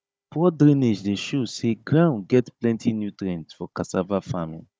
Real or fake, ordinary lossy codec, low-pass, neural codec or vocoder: fake; none; none; codec, 16 kHz, 16 kbps, FunCodec, trained on Chinese and English, 50 frames a second